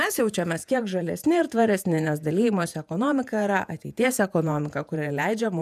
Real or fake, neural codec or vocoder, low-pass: fake; vocoder, 48 kHz, 128 mel bands, Vocos; 14.4 kHz